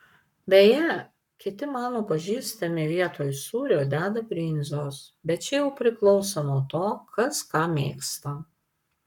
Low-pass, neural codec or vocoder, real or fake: 19.8 kHz; codec, 44.1 kHz, 7.8 kbps, Pupu-Codec; fake